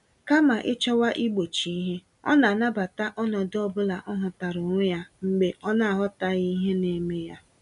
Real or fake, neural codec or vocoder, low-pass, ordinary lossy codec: real; none; 10.8 kHz; none